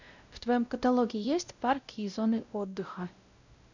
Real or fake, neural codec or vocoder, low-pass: fake; codec, 16 kHz, 0.5 kbps, X-Codec, WavLM features, trained on Multilingual LibriSpeech; 7.2 kHz